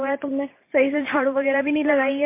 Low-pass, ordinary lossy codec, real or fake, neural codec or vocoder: 3.6 kHz; MP3, 24 kbps; fake; vocoder, 44.1 kHz, 128 mel bands every 512 samples, BigVGAN v2